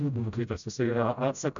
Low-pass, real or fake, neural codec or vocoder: 7.2 kHz; fake; codec, 16 kHz, 0.5 kbps, FreqCodec, smaller model